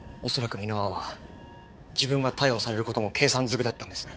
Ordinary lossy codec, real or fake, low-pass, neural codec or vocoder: none; fake; none; codec, 16 kHz, 4 kbps, X-Codec, HuBERT features, trained on balanced general audio